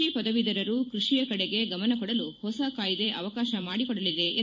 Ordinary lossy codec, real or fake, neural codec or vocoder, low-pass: MP3, 48 kbps; fake; vocoder, 44.1 kHz, 128 mel bands every 256 samples, BigVGAN v2; 7.2 kHz